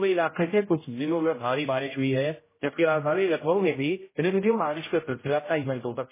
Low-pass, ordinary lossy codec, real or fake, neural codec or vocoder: 3.6 kHz; MP3, 16 kbps; fake; codec, 16 kHz, 0.5 kbps, X-Codec, HuBERT features, trained on general audio